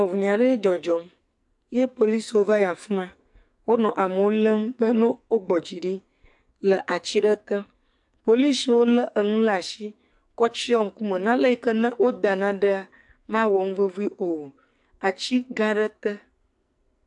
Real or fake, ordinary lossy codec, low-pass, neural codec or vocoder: fake; AAC, 64 kbps; 10.8 kHz; codec, 44.1 kHz, 2.6 kbps, SNAC